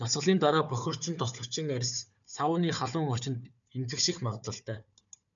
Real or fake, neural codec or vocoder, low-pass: fake; codec, 16 kHz, 4 kbps, FunCodec, trained on Chinese and English, 50 frames a second; 7.2 kHz